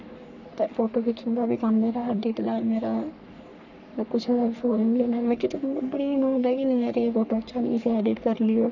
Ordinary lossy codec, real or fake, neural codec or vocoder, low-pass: none; fake; codec, 44.1 kHz, 3.4 kbps, Pupu-Codec; 7.2 kHz